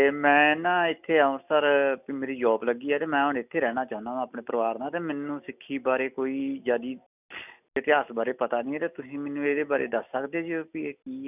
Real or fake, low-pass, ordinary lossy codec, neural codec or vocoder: real; 3.6 kHz; none; none